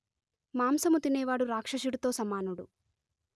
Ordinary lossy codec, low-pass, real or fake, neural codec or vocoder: none; none; real; none